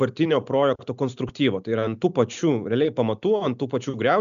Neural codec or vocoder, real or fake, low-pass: none; real; 7.2 kHz